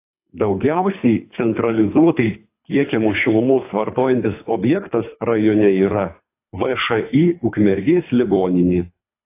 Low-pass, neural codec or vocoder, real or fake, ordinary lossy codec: 3.6 kHz; codec, 24 kHz, 3 kbps, HILCodec; fake; AAC, 24 kbps